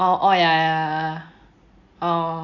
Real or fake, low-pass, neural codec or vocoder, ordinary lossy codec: real; 7.2 kHz; none; none